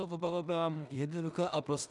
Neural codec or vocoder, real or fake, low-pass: codec, 16 kHz in and 24 kHz out, 0.4 kbps, LongCat-Audio-Codec, two codebook decoder; fake; 10.8 kHz